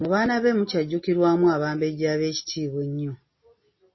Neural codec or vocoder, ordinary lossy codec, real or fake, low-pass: none; MP3, 24 kbps; real; 7.2 kHz